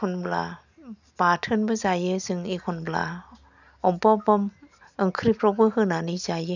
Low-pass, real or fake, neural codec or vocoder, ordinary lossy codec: 7.2 kHz; real; none; none